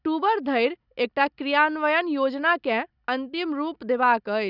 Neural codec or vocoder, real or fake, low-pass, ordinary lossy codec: none; real; 5.4 kHz; none